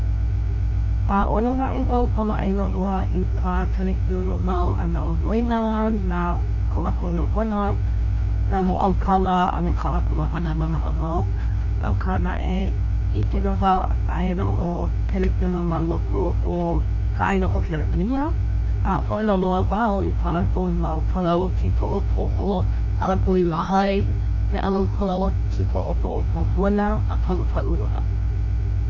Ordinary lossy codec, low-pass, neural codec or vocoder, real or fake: none; 7.2 kHz; codec, 16 kHz, 1 kbps, FreqCodec, larger model; fake